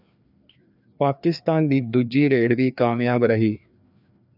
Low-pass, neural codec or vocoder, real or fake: 5.4 kHz; codec, 16 kHz, 2 kbps, FreqCodec, larger model; fake